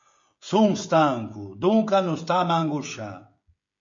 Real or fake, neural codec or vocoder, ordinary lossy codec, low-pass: fake; codec, 16 kHz, 16 kbps, FreqCodec, smaller model; MP3, 48 kbps; 7.2 kHz